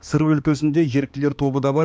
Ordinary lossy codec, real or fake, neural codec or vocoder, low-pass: none; fake; codec, 16 kHz, 2 kbps, X-Codec, HuBERT features, trained on balanced general audio; none